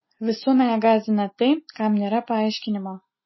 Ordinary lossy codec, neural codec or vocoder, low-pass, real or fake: MP3, 24 kbps; none; 7.2 kHz; real